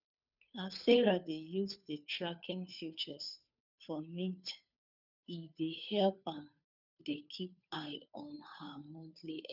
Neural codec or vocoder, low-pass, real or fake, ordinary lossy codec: codec, 16 kHz, 2 kbps, FunCodec, trained on Chinese and English, 25 frames a second; 5.4 kHz; fake; none